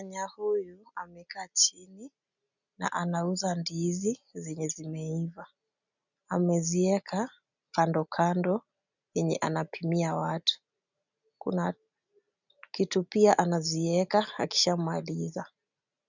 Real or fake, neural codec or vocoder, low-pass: real; none; 7.2 kHz